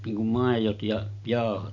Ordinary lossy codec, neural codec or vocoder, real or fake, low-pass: none; none; real; 7.2 kHz